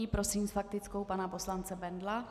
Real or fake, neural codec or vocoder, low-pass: real; none; 14.4 kHz